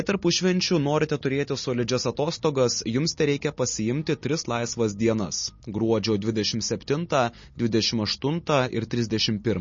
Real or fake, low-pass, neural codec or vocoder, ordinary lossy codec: real; 7.2 kHz; none; MP3, 32 kbps